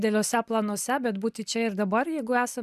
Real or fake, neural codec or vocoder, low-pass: real; none; 14.4 kHz